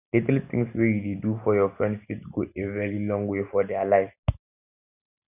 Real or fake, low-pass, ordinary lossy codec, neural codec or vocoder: real; 3.6 kHz; none; none